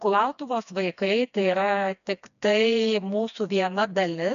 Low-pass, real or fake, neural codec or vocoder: 7.2 kHz; fake; codec, 16 kHz, 2 kbps, FreqCodec, smaller model